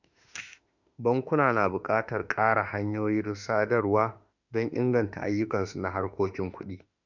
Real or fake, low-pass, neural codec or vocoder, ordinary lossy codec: fake; 7.2 kHz; autoencoder, 48 kHz, 32 numbers a frame, DAC-VAE, trained on Japanese speech; none